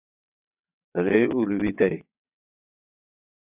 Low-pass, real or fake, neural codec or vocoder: 3.6 kHz; fake; vocoder, 22.05 kHz, 80 mel bands, WaveNeXt